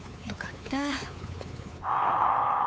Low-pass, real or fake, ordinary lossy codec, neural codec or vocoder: none; fake; none; codec, 16 kHz, 4 kbps, X-Codec, WavLM features, trained on Multilingual LibriSpeech